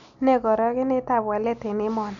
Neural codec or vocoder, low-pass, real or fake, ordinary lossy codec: none; 7.2 kHz; real; none